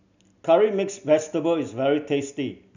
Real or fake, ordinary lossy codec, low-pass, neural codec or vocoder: real; none; 7.2 kHz; none